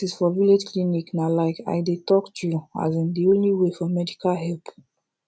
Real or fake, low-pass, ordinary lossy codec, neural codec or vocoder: real; none; none; none